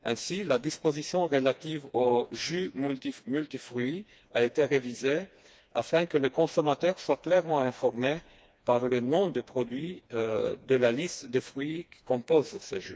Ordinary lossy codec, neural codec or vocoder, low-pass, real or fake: none; codec, 16 kHz, 2 kbps, FreqCodec, smaller model; none; fake